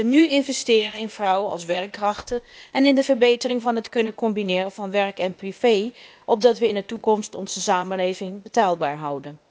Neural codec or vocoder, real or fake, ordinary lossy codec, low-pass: codec, 16 kHz, 0.8 kbps, ZipCodec; fake; none; none